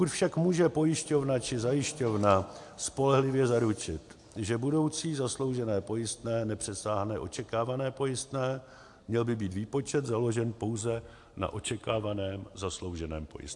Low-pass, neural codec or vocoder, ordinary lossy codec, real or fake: 10.8 kHz; none; AAC, 64 kbps; real